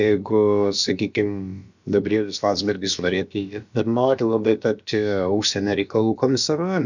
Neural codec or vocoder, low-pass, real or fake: codec, 16 kHz, about 1 kbps, DyCAST, with the encoder's durations; 7.2 kHz; fake